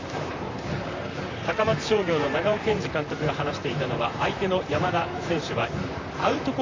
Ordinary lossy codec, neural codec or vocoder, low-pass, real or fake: MP3, 48 kbps; vocoder, 44.1 kHz, 128 mel bands, Pupu-Vocoder; 7.2 kHz; fake